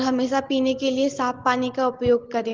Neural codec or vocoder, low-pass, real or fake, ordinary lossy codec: none; 7.2 kHz; real; Opus, 16 kbps